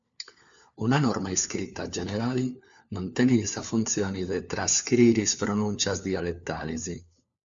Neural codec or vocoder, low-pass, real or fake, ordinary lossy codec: codec, 16 kHz, 4 kbps, FunCodec, trained on LibriTTS, 50 frames a second; 7.2 kHz; fake; MP3, 64 kbps